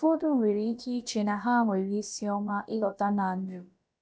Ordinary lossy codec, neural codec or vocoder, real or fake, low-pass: none; codec, 16 kHz, about 1 kbps, DyCAST, with the encoder's durations; fake; none